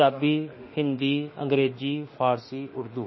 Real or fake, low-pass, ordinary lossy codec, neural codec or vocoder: fake; 7.2 kHz; MP3, 24 kbps; autoencoder, 48 kHz, 32 numbers a frame, DAC-VAE, trained on Japanese speech